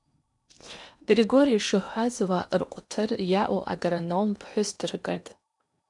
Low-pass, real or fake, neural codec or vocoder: 10.8 kHz; fake; codec, 16 kHz in and 24 kHz out, 0.8 kbps, FocalCodec, streaming, 65536 codes